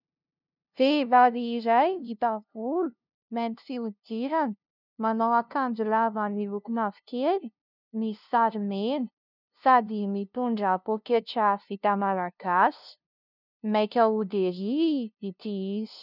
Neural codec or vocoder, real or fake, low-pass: codec, 16 kHz, 0.5 kbps, FunCodec, trained on LibriTTS, 25 frames a second; fake; 5.4 kHz